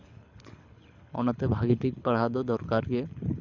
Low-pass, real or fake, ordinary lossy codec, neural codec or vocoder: 7.2 kHz; fake; none; codec, 24 kHz, 6 kbps, HILCodec